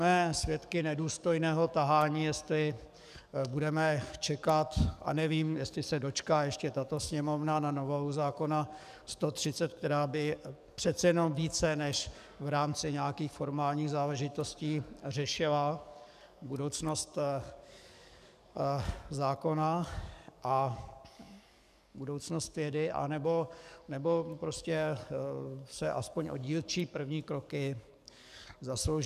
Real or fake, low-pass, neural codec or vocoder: fake; 14.4 kHz; codec, 44.1 kHz, 7.8 kbps, DAC